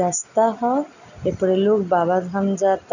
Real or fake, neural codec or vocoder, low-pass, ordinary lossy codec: real; none; 7.2 kHz; none